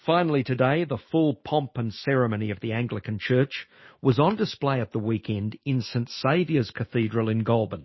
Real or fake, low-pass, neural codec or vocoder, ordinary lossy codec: fake; 7.2 kHz; autoencoder, 48 kHz, 128 numbers a frame, DAC-VAE, trained on Japanese speech; MP3, 24 kbps